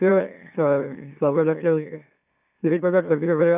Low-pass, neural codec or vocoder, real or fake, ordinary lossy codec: 3.6 kHz; autoencoder, 44.1 kHz, a latent of 192 numbers a frame, MeloTTS; fake; none